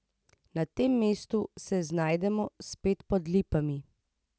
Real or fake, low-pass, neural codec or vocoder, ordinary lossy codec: real; none; none; none